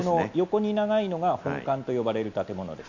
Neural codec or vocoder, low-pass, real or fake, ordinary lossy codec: none; 7.2 kHz; real; none